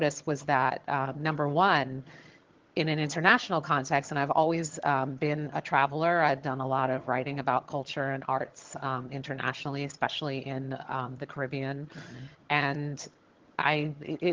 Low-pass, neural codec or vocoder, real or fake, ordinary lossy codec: 7.2 kHz; vocoder, 22.05 kHz, 80 mel bands, HiFi-GAN; fake; Opus, 16 kbps